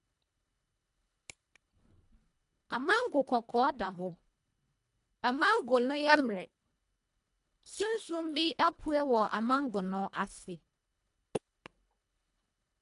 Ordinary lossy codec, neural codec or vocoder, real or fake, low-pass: MP3, 64 kbps; codec, 24 kHz, 1.5 kbps, HILCodec; fake; 10.8 kHz